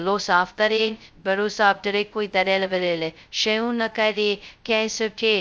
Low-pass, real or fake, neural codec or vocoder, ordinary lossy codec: none; fake; codec, 16 kHz, 0.2 kbps, FocalCodec; none